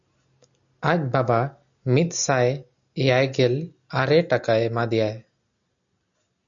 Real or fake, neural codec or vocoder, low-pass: real; none; 7.2 kHz